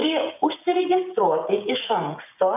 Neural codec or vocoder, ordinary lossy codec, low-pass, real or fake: codec, 16 kHz, 16 kbps, FreqCodec, smaller model; AAC, 32 kbps; 3.6 kHz; fake